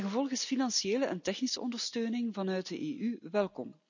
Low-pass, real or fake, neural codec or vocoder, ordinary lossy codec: 7.2 kHz; real; none; none